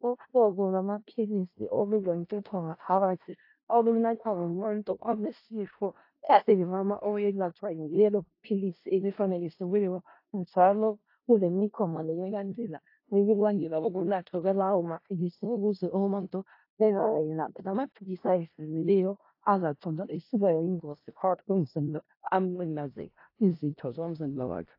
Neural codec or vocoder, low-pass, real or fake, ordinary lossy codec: codec, 16 kHz in and 24 kHz out, 0.4 kbps, LongCat-Audio-Codec, four codebook decoder; 5.4 kHz; fake; AAC, 32 kbps